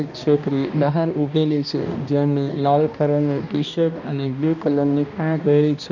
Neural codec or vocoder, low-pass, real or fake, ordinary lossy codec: codec, 16 kHz, 1 kbps, X-Codec, HuBERT features, trained on balanced general audio; 7.2 kHz; fake; none